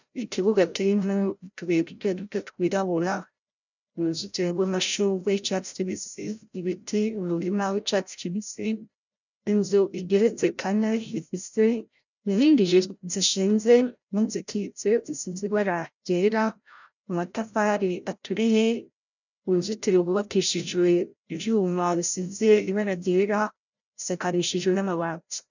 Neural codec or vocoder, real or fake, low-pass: codec, 16 kHz, 0.5 kbps, FreqCodec, larger model; fake; 7.2 kHz